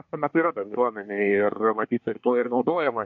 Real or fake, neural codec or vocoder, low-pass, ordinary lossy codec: fake; codec, 24 kHz, 1 kbps, SNAC; 7.2 kHz; MP3, 48 kbps